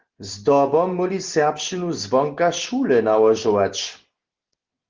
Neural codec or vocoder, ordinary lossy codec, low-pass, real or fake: none; Opus, 16 kbps; 7.2 kHz; real